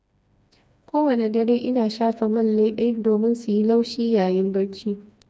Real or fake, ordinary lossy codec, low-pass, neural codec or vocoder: fake; none; none; codec, 16 kHz, 2 kbps, FreqCodec, smaller model